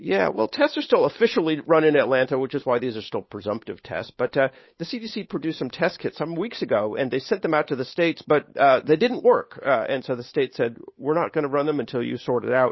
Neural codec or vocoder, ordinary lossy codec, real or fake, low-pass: codec, 16 kHz, 8 kbps, FunCodec, trained on Chinese and English, 25 frames a second; MP3, 24 kbps; fake; 7.2 kHz